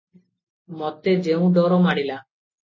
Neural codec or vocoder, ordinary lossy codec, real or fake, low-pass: none; MP3, 32 kbps; real; 7.2 kHz